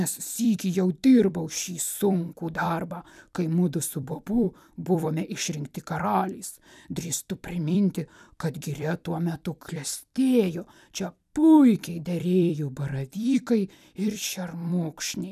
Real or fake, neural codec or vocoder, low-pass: fake; vocoder, 44.1 kHz, 128 mel bands every 256 samples, BigVGAN v2; 14.4 kHz